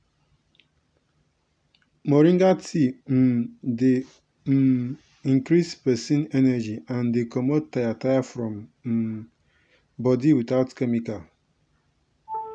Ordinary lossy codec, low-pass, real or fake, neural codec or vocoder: none; 9.9 kHz; real; none